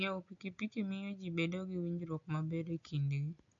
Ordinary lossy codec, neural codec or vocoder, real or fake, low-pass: AAC, 64 kbps; none; real; 7.2 kHz